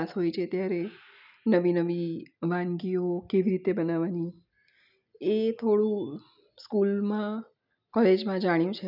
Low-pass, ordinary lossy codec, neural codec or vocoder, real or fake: 5.4 kHz; none; none; real